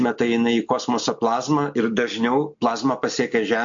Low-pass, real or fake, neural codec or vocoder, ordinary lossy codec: 7.2 kHz; real; none; AAC, 64 kbps